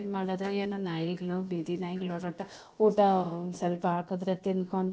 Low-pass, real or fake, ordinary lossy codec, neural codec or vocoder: none; fake; none; codec, 16 kHz, about 1 kbps, DyCAST, with the encoder's durations